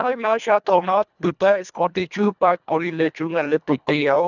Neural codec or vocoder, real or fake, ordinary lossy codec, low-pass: codec, 24 kHz, 1.5 kbps, HILCodec; fake; none; 7.2 kHz